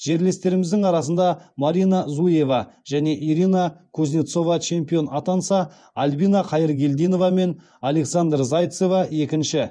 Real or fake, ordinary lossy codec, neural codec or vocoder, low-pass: fake; none; vocoder, 24 kHz, 100 mel bands, Vocos; 9.9 kHz